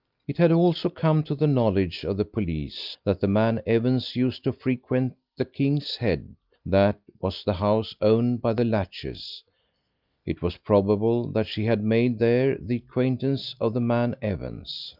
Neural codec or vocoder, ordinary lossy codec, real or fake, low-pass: none; Opus, 24 kbps; real; 5.4 kHz